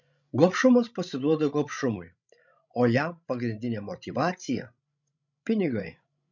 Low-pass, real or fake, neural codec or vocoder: 7.2 kHz; fake; codec, 16 kHz, 16 kbps, FreqCodec, larger model